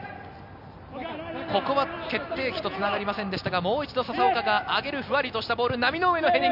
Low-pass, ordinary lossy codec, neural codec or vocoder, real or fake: 5.4 kHz; none; none; real